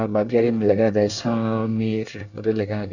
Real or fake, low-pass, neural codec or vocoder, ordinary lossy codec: fake; 7.2 kHz; codec, 24 kHz, 1 kbps, SNAC; none